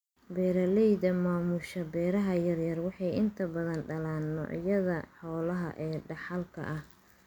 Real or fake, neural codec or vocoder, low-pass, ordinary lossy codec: real; none; 19.8 kHz; none